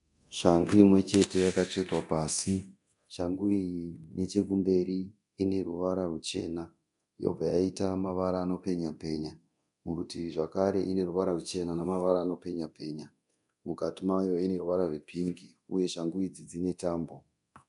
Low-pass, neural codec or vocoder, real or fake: 10.8 kHz; codec, 24 kHz, 0.9 kbps, DualCodec; fake